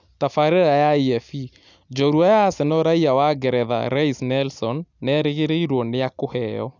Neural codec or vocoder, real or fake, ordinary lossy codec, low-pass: none; real; none; 7.2 kHz